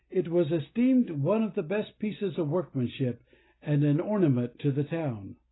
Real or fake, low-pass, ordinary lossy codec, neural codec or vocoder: real; 7.2 kHz; AAC, 16 kbps; none